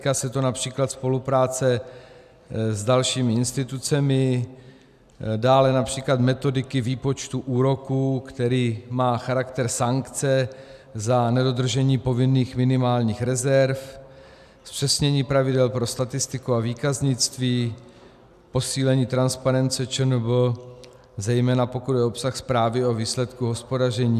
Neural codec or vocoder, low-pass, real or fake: none; 14.4 kHz; real